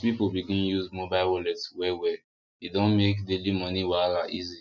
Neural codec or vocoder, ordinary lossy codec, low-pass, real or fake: none; none; 7.2 kHz; real